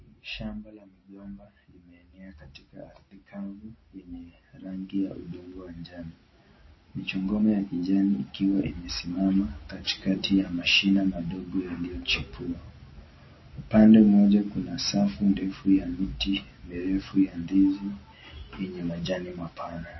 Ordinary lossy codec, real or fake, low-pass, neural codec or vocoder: MP3, 24 kbps; real; 7.2 kHz; none